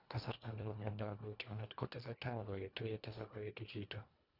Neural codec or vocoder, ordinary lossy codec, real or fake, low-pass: codec, 24 kHz, 1.5 kbps, HILCodec; none; fake; 5.4 kHz